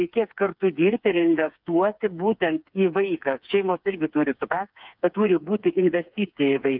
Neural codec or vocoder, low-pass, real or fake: codec, 16 kHz, 4 kbps, FreqCodec, smaller model; 5.4 kHz; fake